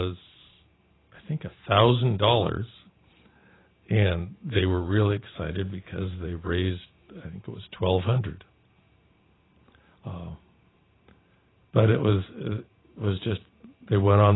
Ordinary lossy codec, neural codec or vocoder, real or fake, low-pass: AAC, 16 kbps; vocoder, 44.1 kHz, 128 mel bands every 512 samples, BigVGAN v2; fake; 7.2 kHz